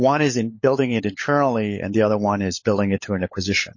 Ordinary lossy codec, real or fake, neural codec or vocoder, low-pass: MP3, 32 kbps; fake; codec, 16 kHz, 16 kbps, FunCodec, trained on LibriTTS, 50 frames a second; 7.2 kHz